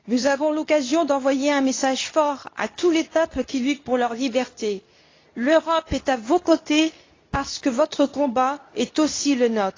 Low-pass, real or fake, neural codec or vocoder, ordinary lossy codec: 7.2 kHz; fake; codec, 24 kHz, 0.9 kbps, WavTokenizer, medium speech release version 2; AAC, 32 kbps